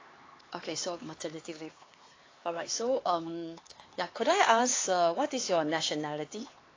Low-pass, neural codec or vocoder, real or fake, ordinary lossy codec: 7.2 kHz; codec, 16 kHz, 4 kbps, X-Codec, HuBERT features, trained on LibriSpeech; fake; AAC, 32 kbps